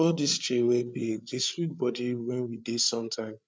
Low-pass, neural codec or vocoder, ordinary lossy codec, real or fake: none; codec, 16 kHz, 8 kbps, FreqCodec, larger model; none; fake